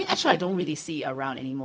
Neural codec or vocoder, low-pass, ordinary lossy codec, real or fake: codec, 16 kHz, 0.4 kbps, LongCat-Audio-Codec; none; none; fake